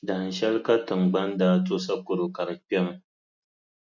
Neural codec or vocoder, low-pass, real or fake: none; 7.2 kHz; real